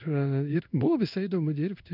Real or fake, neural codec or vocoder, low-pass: fake; codec, 16 kHz in and 24 kHz out, 1 kbps, XY-Tokenizer; 5.4 kHz